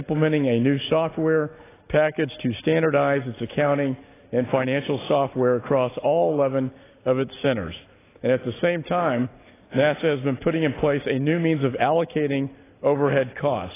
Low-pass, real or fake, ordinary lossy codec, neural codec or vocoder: 3.6 kHz; real; AAC, 16 kbps; none